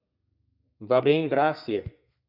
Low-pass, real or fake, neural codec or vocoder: 5.4 kHz; fake; codec, 32 kHz, 1.9 kbps, SNAC